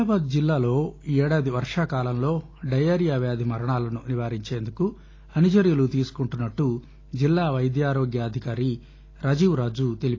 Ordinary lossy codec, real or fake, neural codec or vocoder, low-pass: AAC, 32 kbps; real; none; 7.2 kHz